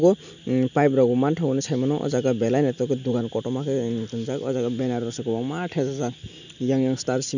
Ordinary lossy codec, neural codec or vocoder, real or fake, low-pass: none; none; real; 7.2 kHz